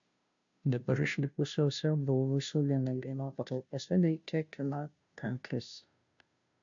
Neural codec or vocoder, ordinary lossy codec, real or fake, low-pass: codec, 16 kHz, 0.5 kbps, FunCodec, trained on Chinese and English, 25 frames a second; AAC, 64 kbps; fake; 7.2 kHz